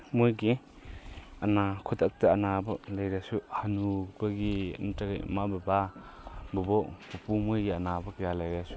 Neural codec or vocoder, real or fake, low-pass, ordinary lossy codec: none; real; none; none